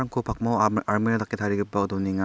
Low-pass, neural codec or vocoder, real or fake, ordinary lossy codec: none; none; real; none